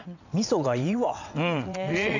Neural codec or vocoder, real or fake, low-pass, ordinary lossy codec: vocoder, 22.05 kHz, 80 mel bands, WaveNeXt; fake; 7.2 kHz; none